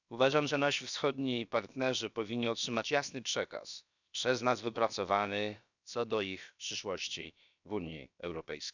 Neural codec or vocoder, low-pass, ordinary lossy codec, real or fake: codec, 16 kHz, about 1 kbps, DyCAST, with the encoder's durations; 7.2 kHz; none; fake